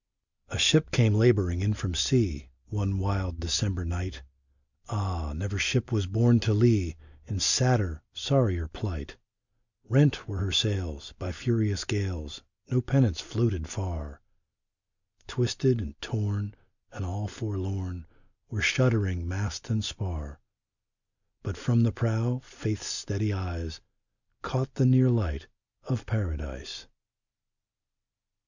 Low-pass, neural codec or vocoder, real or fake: 7.2 kHz; none; real